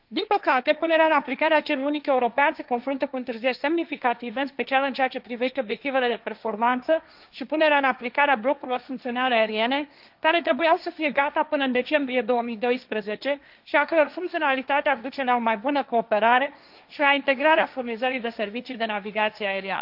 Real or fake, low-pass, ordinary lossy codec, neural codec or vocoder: fake; 5.4 kHz; none; codec, 16 kHz, 1.1 kbps, Voila-Tokenizer